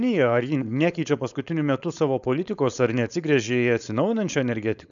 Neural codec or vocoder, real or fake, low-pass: codec, 16 kHz, 4.8 kbps, FACodec; fake; 7.2 kHz